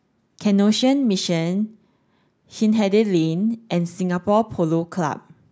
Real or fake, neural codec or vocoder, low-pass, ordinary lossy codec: real; none; none; none